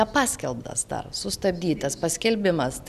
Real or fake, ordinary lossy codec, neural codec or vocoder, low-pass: real; Opus, 64 kbps; none; 14.4 kHz